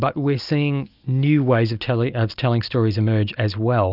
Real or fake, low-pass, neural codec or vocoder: real; 5.4 kHz; none